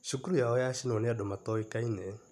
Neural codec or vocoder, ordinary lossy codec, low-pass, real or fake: none; none; 14.4 kHz; real